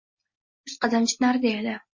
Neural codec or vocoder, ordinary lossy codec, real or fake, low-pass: none; MP3, 32 kbps; real; 7.2 kHz